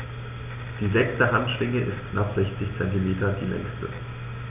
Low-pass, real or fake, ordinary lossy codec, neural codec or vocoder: 3.6 kHz; real; none; none